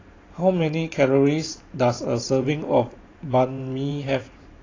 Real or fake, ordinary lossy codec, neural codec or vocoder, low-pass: fake; AAC, 32 kbps; vocoder, 44.1 kHz, 128 mel bands every 512 samples, BigVGAN v2; 7.2 kHz